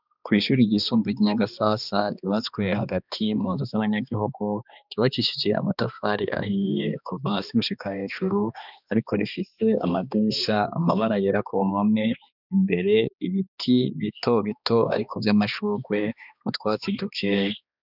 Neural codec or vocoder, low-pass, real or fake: codec, 16 kHz, 2 kbps, X-Codec, HuBERT features, trained on balanced general audio; 5.4 kHz; fake